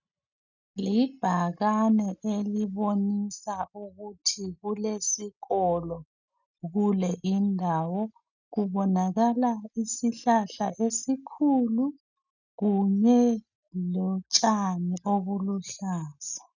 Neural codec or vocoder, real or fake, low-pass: none; real; 7.2 kHz